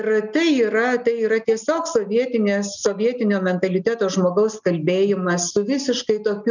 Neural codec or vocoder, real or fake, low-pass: none; real; 7.2 kHz